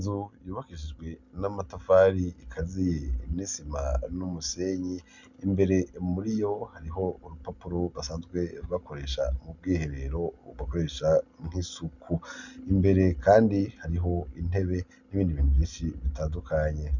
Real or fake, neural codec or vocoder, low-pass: real; none; 7.2 kHz